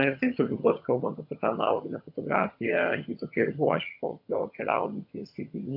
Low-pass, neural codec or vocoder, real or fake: 5.4 kHz; vocoder, 22.05 kHz, 80 mel bands, HiFi-GAN; fake